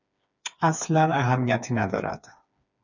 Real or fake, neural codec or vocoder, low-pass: fake; codec, 16 kHz, 4 kbps, FreqCodec, smaller model; 7.2 kHz